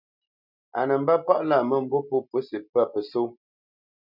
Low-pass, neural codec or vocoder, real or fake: 5.4 kHz; none; real